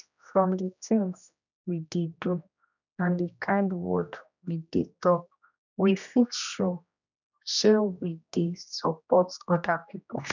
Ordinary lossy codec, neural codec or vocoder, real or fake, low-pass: none; codec, 16 kHz, 1 kbps, X-Codec, HuBERT features, trained on general audio; fake; 7.2 kHz